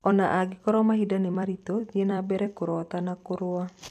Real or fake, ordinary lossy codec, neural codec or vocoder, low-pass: fake; none; vocoder, 44.1 kHz, 128 mel bands every 256 samples, BigVGAN v2; 14.4 kHz